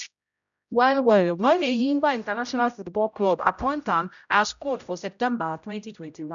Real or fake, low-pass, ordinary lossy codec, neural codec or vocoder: fake; 7.2 kHz; none; codec, 16 kHz, 0.5 kbps, X-Codec, HuBERT features, trained on general audio